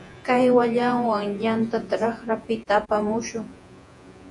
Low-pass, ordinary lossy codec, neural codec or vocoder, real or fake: 10.8 kHz; AAC, 64 kbps; vocoder, 48 kHz, 128 mel bands, Vocos; fake